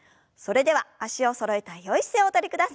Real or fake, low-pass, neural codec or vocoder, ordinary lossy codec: real; none; none; none